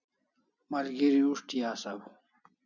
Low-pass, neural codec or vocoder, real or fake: 7.2 kHz; none; real